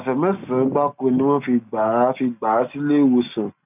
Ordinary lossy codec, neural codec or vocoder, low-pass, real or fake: none; none; 3.6 kHz; real